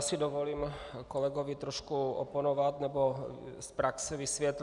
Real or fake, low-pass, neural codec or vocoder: real; 10.8 kHz; none